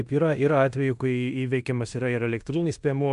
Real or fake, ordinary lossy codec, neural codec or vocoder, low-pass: fake; MP3, 96 kbps; codec, 16 kHz in and 24 kHz out, 0.9 kbps, LongCat-Audio-Codec, fine tuned four codebook decoder; 10.8 kHz